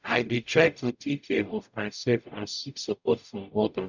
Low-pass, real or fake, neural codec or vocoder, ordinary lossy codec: 7.2 kHz; fake; codec, 44.1 kHz, 0.9 kbps, DAC; none